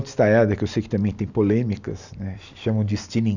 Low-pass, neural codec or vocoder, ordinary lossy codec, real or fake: 7.2 kHz; none; none; real